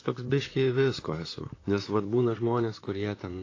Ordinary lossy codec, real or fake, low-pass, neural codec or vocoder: AAC, 32 kbps; fake; 7.2 kHz; vocoder, 44.1 kHz, 128 mel bands, Pupu-Vocoder